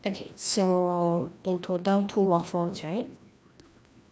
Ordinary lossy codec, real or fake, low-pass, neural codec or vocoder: none; fake; none; codec, 16 kHz, 1 kbps, FreqCodec, larger model